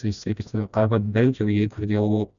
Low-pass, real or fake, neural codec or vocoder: 7.2 kHz; fake; codec, 16 kHz, 1 kbps, FreqCodec, smaller model